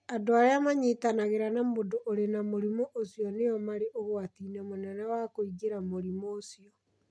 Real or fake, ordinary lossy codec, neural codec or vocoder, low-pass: real; none; none; none